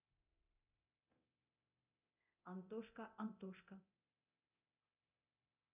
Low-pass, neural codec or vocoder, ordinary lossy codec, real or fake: 3.6 kHz; codec, 24 kHz, 0.9 kbps, DualCodec; none; fake